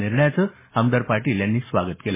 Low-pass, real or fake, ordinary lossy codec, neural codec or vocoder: 3.6 kHz; real; MP3, 16 kbps; none